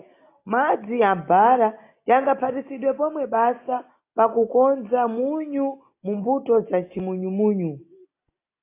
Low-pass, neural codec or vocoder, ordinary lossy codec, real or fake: 3.6 kHz; none; AAC, 24 kbps; real